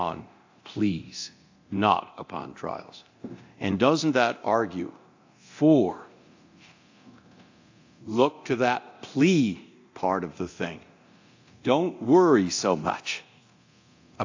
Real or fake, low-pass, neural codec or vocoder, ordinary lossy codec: fake; 7.2 kHz; codec, 24 kHz, 0.9 kbps, DualCodec; AAC, 48 kbps